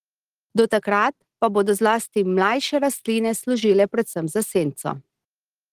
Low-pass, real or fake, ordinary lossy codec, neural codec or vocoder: 14.4 kHz; real; Opus, 16 kbps; none